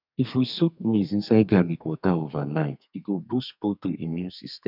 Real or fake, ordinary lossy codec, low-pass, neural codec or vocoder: fake; none; 5.4 kHz; codec, 32 kHz, 1.9 kbps, SNAC